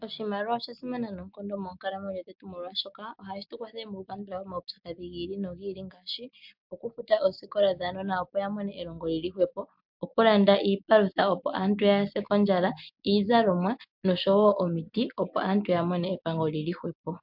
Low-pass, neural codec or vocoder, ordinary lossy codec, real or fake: 5.4 kHz; none; MP3, 48 kbps; real